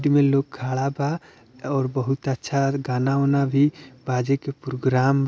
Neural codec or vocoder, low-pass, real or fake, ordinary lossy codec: none; none; real; none